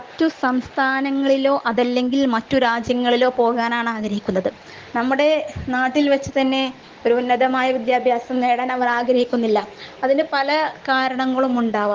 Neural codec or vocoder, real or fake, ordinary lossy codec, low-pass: none; real; Opus, 16 kbps; 7.2 kHz